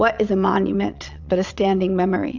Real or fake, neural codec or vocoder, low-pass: fake; vocoder, 22.05 kHz, 80 mel bands, WaveNeXt; 7.2 kHz